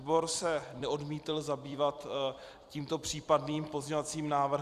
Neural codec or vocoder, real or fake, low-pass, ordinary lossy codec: vocoder, 44.1 kHz, 128 mel bands every 256 samples, BigVGAN v2; fake; 14.4 kHz; Opus, 64 kbps